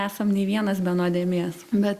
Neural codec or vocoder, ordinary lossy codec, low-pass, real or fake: none; Opus, 64 kbps; 14.4 kHz; real